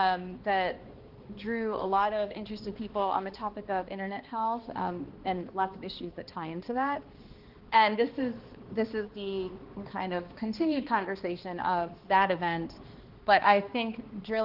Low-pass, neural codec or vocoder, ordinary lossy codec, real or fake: 5.4 kHz; codec, 16 kHz, 2 kbps, X-Codec, HuBERT features, trained on balanced general audio; Opus, 16 kbps; fake